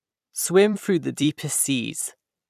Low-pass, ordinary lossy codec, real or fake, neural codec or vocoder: 14.4 kHz; none; fake; vocoder, 44.1 kHz, 128 mel bands every 256 samples, BigVGAN v2